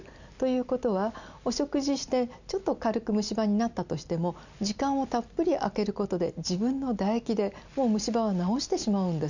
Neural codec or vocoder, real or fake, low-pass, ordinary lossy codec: none; real; 7.2 kHz; none